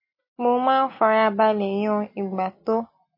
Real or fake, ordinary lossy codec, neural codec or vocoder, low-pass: real; MP3, 24 kbps; none; 5.4 kHz